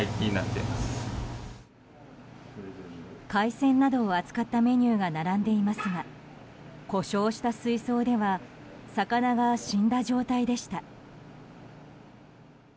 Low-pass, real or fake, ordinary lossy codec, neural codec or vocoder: none; real; none; none